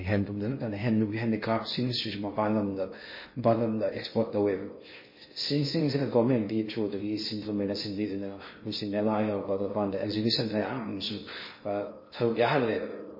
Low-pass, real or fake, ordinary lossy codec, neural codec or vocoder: 5.4 kHz; fake; MP3, 24 kbps; codec, 16 kHz in and 24 kHz out, 0.6 kbps, FocalCodec, streaming, 2048 codes